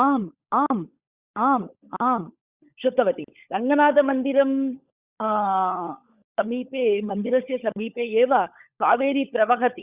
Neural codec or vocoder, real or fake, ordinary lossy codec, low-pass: codec, 16 kHz, 16 kbps, FunCodec, trained on LibriTTS, 50 frames a second; fake; Opus, 64 kbps; 3.6 kHz